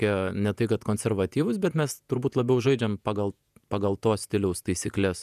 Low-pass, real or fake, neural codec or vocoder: 14.4 kHz; fake; vocoder, 48 kHz, 128 mel bands, Vocos